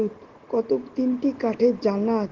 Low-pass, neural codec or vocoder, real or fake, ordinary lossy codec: 7.2 kHz; none; real; Opus, 16 kbps